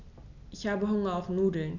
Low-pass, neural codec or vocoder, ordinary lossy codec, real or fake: 7.2 kHz; none; none; real